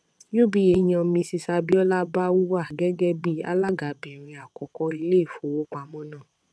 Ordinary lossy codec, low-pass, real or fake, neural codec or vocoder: none; none; fake; vocoder, 22.05 kHz, 80 mel bands, WaveNeXt